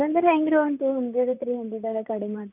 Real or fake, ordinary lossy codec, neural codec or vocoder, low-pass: fake; none; vocoder, 44.1 kHz, 128 mel bands, Pupu-Vocoder; 3.6 kHz